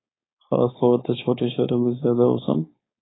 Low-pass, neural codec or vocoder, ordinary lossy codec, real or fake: 7.2 kHz; codec, 16 kHz, 4.8 kbps, FACodec; AAC, 16 kbps; fake